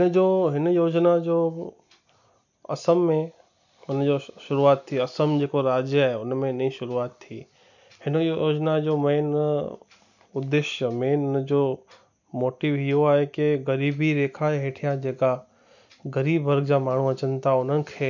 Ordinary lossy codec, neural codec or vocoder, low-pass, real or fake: none; none; 7.2 kHz; real